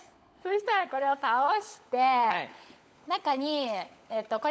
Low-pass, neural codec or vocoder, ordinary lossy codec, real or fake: none; codec, 16 kHz, 16 kbps, FunCodec, trained on LibriTTS, 50 frames a second; none; fake